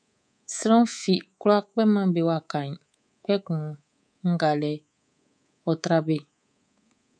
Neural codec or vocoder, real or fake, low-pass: codec, 24 kHz, 3.1 kbps, DualCodec; fake; 9.9 kHz